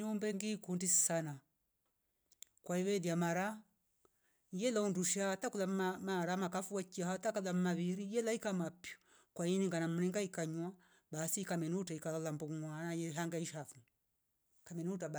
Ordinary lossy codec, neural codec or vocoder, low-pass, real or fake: none; none; none; real